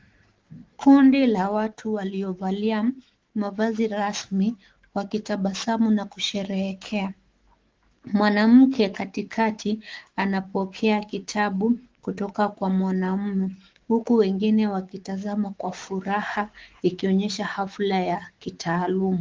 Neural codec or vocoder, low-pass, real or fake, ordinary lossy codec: autoencoder, 48 kHz, 128 numbers a frame, DAC-VAE, trained on Japanese speech; 7.2 kHz; fake; Opus, 16 kbps